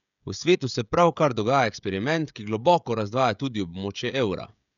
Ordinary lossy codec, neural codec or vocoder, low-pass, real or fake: none; codec, 16 kHz, 16 kbps, FreqCodec, smaller model; 7.2 kHz; fake